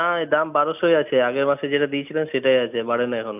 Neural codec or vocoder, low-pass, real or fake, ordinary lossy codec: none; 3.6 kHz; real; none